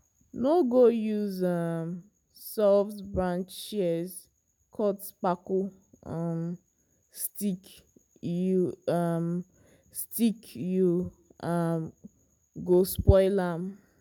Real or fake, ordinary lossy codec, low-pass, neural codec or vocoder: real; none; none; none